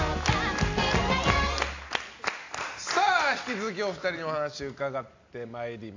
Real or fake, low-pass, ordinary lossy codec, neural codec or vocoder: real; 7.2 kHz; AAC, 48 kbps; none